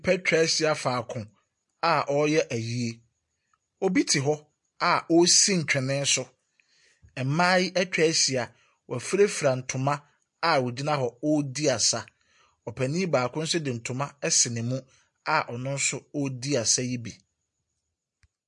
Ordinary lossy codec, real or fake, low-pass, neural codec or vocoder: MP3, 48 kbps; real; 10.8 kHz; none